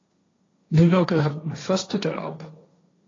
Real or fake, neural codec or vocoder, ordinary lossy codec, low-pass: fake; codec, 16 kHz, 1.1 kbps, Voila-Tokenizer; AAC, 32 kbps; 7.2 kHz